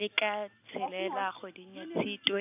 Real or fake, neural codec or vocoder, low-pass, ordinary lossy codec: real; none; 3.6 kHz; AAC, 32 kbps